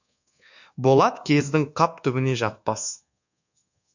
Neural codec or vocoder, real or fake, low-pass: codec, 24 kHz, 1.2 kbps, DualCodec; fake; 7.2 kHz